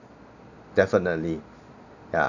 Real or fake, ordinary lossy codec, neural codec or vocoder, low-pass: real; none; none; 7.2 kHz